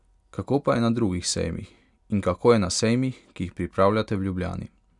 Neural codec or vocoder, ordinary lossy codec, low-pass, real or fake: none; none; 10.8 kHz; real